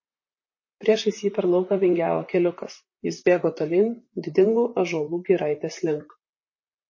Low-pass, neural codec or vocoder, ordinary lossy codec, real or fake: 7.2 kHz; vocoder, 44.1 kHz, 128 mel bands, Pupu-Vocoder; MP3, 32 kbps; fake